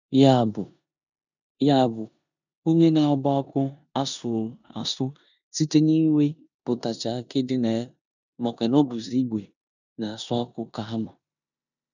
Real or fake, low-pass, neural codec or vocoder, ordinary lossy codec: fake; 7.2 kHz; codec, 16 kHz in and 24 kHz out, 0.9 kbps, LongCat-Audio-Codec, four codebook decoder; none